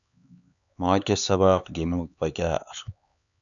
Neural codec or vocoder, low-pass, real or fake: codec, 16 kHz, 4 kbps, X-Codec, HuBERT features, trained on LibriSpeech; 7.2 kHz; fake